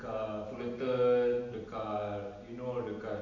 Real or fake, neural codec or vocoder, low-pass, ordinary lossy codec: real; none; 7.2 kHz; none